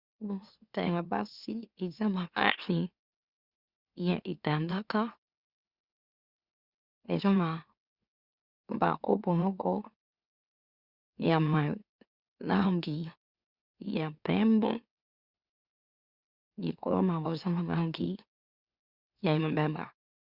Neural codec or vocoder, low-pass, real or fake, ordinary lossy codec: autoencoder, 44.1 kHz, a latent of 192 numbers a frame, MeloTTS; 5.4 kHz; fake; Opus, 64 kbps